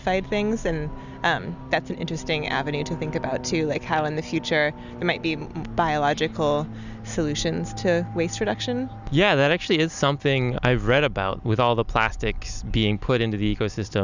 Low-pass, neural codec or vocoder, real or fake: 7.2 kHz; none; real